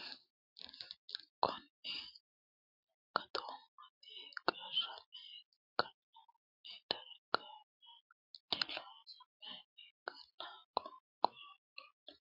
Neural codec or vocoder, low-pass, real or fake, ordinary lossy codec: none; 5.4 kHz; real; MP3, 48 kbps